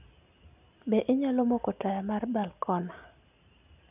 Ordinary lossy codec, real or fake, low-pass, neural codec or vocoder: none; real; 3.6 kHz; none